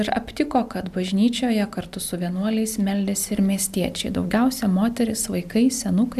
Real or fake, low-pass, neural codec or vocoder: real; 14.4 kHz; none